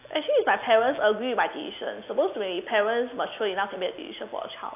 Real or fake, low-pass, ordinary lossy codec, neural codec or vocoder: real; 3.6 kHz; AAC, 32 kbps; none